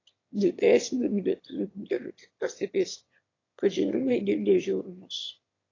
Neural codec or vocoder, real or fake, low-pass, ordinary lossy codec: autoencoder, 22.05 kHz, a latent of 192 numbers a frame, VITS, trained on one speaker; fake; 7.2 kHz; AAC, 32 kbps